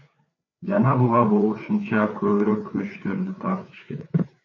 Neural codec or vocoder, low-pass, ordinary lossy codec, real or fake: codec, 16 kHz, 4 kbps, FreqCodec, larger model; 7.2 kHz; MP3, 48 kbps; fake